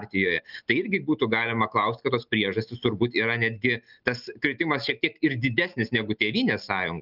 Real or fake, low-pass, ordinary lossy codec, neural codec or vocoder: real; 5.4 kHz; Opus, 24 kbps; none